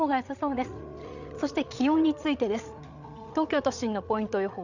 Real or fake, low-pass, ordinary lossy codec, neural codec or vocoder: fake; 7.2 kHz; none; codec, 16 kHz, 8 kbps, FreqCodec, larger model